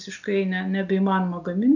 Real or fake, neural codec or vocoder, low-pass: real; none; 7.2 kHz